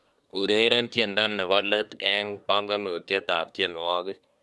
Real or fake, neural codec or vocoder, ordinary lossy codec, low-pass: fake; codec, 24 kHz, 1 kbps, SNAC; none; none